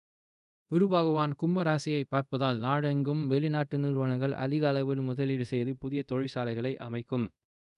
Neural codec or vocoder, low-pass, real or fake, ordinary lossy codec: codec, 24 kHz, 0.5 kbps, DualCodec; 10.8 kHz; fake; none